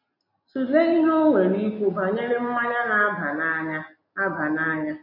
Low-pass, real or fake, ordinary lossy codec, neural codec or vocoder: 5.4 kHz; real; MP3, 32 kbps; none